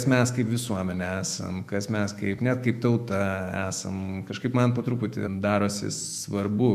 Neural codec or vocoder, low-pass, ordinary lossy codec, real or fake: autoencoder, 48 kHz, 128 numbers a frame, DAC-VAE, trained on Japanese speech; 14.4 kHz; MP3, 96 kbps; fake